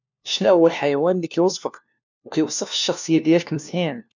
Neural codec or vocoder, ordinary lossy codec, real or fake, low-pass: codec, 16 kHz, 1 kbps, FunCodec, trained on LibriTTS, 50 frames a second; none; fake; 7.2 kHz